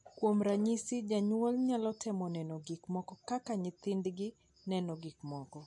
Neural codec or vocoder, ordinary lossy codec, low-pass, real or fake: none; MP3, 48 kbps; 10.8 kHz; real